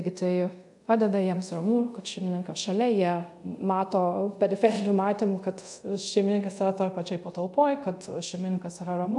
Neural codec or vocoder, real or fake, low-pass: codec, 24 kHz, 0.5 kbps, DualCodec; fake; 10.8 kHz